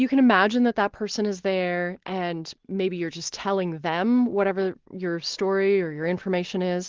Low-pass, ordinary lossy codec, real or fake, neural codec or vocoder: 7.2 kHz; Opus, 16 kbps; real; none